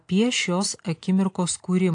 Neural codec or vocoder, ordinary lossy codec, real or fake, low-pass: none; AAC, 48 kbps; real; 9.9 kHz